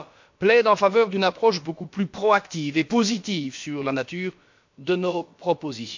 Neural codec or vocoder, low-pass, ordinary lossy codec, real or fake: codec, 16 kHz, about 1 kbps, DyCAST, with the encoder's durations; 7.2 kHz; MP3, 48 kbps; fake